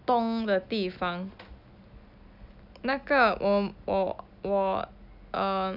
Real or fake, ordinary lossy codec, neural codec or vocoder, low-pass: real; none; none; 5.4 kHz